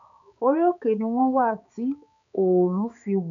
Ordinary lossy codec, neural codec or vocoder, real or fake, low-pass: MP3, 64 kbps; codec, 16 kHz, 4 kbps, X-Codec, HuBERT features, trained on balanced general audio; fake; 7.2 kHz